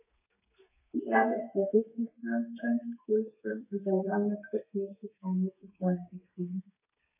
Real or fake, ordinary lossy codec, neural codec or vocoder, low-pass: fake; none; codec, 32 kHz, 1.9 kbps, SNAC; 3.6 kHz